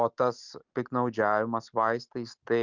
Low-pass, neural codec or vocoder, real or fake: 7.2 kHz; none; real